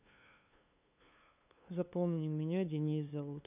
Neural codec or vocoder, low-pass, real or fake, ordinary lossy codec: codec, 16 kHz, 2 kbps, FunCodec, trained on LibriTTS, 25 frames a second; 3.6 kHz; fake; none